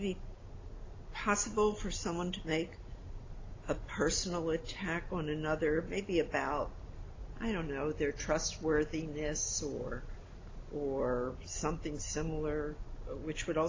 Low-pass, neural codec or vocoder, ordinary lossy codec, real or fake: 7.2 kHz; none; AAC, 32 kbps; real